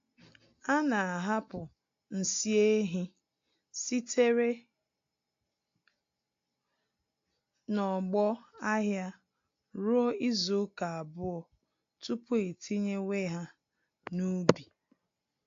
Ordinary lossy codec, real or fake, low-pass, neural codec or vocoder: AAC, 48 kbps; real; 7.2 kHz; none